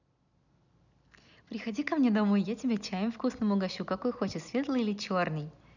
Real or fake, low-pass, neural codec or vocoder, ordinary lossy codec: real; 7.2 kHz; none; none